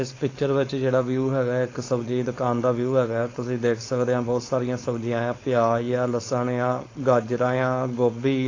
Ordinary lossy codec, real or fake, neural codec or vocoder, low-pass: AAC, 32 kbps; fake; codec, 16 kHz, 4.8 kbps, FACodec; 7.2 kHz